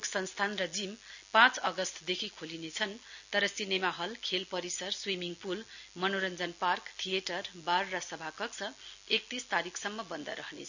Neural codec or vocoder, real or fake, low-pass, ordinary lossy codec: none; real; 7.2 kHz; none